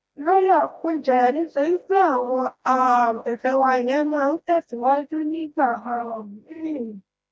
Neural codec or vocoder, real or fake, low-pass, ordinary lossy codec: codec, 16 kHz, 1 kbps, FreqCodec, smaller model; fake; none; none